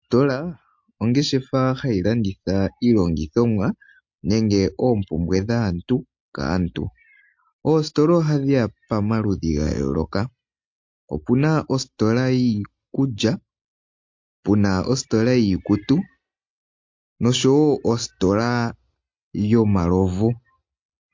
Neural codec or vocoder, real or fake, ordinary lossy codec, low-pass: none; real; MP3, 48 kbps; 7.2 kHz